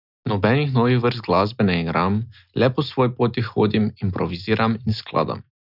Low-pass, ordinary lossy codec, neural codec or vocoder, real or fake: 5.4 kHz; none; none; real